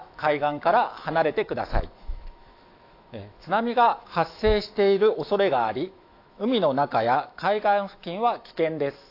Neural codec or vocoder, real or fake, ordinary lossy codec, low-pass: autoencoder, 48 kHz, 128 numbers a frame, DAC-VAE, trained on Japanese speech; fake; AAC, 32 kbps; 5.4 kHz